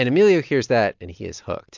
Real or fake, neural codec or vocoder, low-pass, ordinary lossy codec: real; none; 7.2 kHz; MP3, 64 kbps